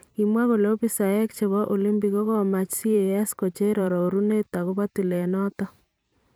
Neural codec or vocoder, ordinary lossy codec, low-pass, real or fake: vocoder, 44.1 kHz, 128 mel bands every 512 samples, BigVGAN v2; none; none; fake